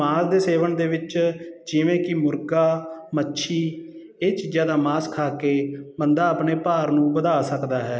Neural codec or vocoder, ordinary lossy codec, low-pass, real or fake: none; none; none; real